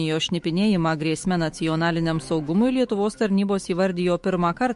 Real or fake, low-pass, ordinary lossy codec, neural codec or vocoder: fake; 14.4 kHz; MP3, 48 kbps; autoencoder, 48 kHz, 128 numbers a frame, DAC-VAE, trained on Japanese speech